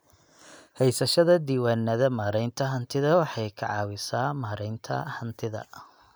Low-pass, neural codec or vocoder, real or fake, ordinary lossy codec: none; none; real; none